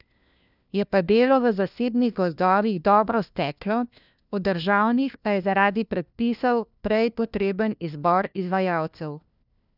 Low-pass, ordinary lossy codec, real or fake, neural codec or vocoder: 5.4 kHz; none; fake; codec, 16 kHz, 1 kbps, FunCodec, trained on LibriTTS, 50 frames a second